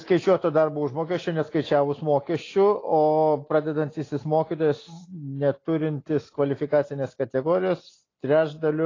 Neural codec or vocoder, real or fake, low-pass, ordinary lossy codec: none; real; 7.2 kHz; AAC, 32 kbps